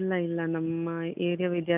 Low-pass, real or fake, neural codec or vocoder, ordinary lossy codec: 3.6 kHz; real; none; none